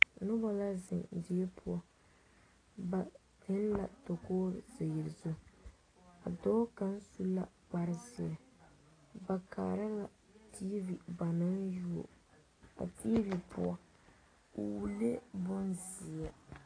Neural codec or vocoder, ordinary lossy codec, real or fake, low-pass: none; AAC, 32 kbps; real; 9.9 kHz